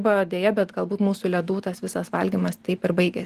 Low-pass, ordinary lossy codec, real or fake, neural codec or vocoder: 14.4 kHz; Opus, 24 kbps; real; none